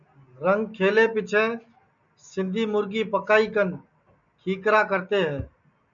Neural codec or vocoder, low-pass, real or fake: none; 7.2 kHz; real